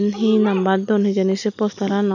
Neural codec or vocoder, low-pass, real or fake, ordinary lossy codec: none; 7.2 kHz; real; none